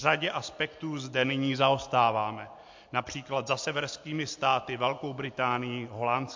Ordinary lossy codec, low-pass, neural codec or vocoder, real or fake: MP3, 48 kbps; 7.2 kHz; none; real